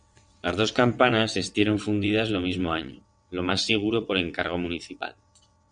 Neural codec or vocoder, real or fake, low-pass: vocoder, 22.05 kHz, 80 mel bands, WaveNeXt; fake; 9.9 kHz